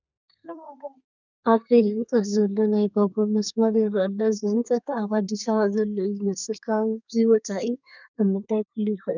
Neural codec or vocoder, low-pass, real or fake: codec, 32 kHz, 1.9 kbps, SNAC; 7.2 kHz; fake